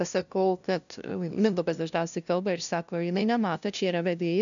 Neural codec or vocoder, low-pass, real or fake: codec, 16 kHz, 0.5 kbps, FunCodec, trained on LibriTTS, 25 frames a second; 7.2 kHz; fake